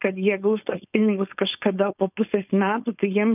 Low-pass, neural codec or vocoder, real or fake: 3.6 kHz; codec, 16 kHz, 4.8 kbps, FACodec; fake